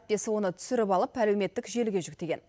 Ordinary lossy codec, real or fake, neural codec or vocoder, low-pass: none; real; none; none